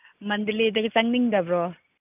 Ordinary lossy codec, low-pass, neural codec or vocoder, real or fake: AAC, 32 kbps; 3.6 kHz; none; real